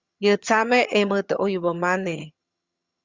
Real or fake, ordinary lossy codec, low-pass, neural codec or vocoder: fake; Opus, 64 kbps; 7.2 kHz; vocoder, 22.05 kHz, 80 mel bands, HiFi-GAN